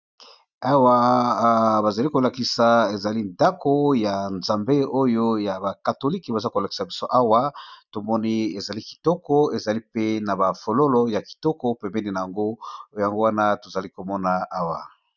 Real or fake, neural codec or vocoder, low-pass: real; none; 7.2 kHz